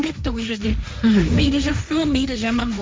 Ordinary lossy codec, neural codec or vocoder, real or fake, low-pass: none; codec, 16 kHz, 1.1 kbps, Voila-Tokenizer; fake; none